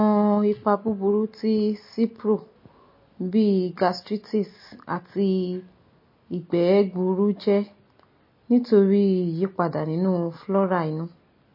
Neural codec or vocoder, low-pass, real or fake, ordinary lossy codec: none; 5.4 kHz; real; MP3, 24 kbps